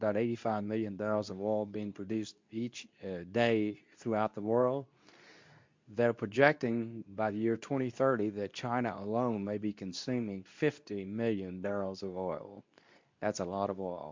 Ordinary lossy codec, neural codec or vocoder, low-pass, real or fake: MP3, 64 kbps; codec, 24 kHz, 0.9 kbps, WavTokenizer, medium speech release version 2; 7.2 kHz; fake